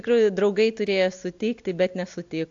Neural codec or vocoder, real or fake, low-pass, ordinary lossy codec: none; real; 7.2 kHz; AAC, 64 kbps